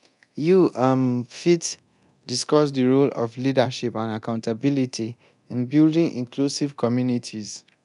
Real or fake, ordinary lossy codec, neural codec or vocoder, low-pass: fake; none; codec, 24 kHz, 0.9 kbps, DualCodec; 10.8 kHz